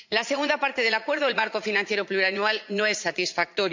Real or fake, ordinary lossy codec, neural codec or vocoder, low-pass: fake; MP3, 64 kbps; vocoder, 44.1 kHz, 80 mel bands, Vocos; 7.2 kHz